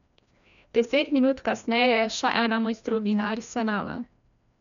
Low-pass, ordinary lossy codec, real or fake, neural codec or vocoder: 7.2 kHz; none; fake; codec, 16 kHz, 1 kbps, FreqCodec, larger model